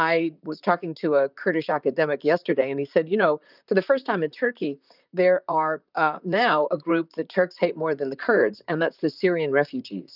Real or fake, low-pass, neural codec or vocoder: fake; 5.4 kHz; vocoder, 44.1 kHz, 128 mel bands, Pupu-Vocoder